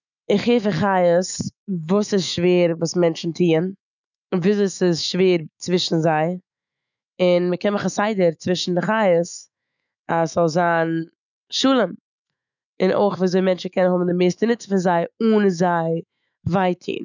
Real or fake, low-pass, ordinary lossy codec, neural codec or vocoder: fake; 7.2 kHz; none; autoencoder, 48 kHz, 128 numbers a frame, DAC-VAE, trained on Japanese speech